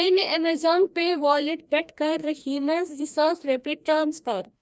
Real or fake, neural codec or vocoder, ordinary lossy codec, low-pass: fake; codec, 16 kHz, 1 kbps, FreqCodec, larger model; none; none